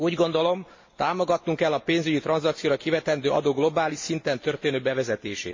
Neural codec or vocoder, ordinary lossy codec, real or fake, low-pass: none; MP3, 32 kbps; real; 7.2 kHz